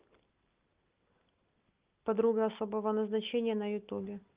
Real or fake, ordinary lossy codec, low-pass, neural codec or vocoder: real; Opus, 32 kbps; 3.6 kHz; none